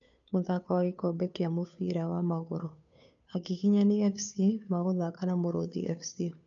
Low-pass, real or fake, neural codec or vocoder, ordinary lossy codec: 7.2 kHz; fake; codec, 16 kHz, 2 kbps, FunCodec, trained on Chinese and English, 25 frames a second; none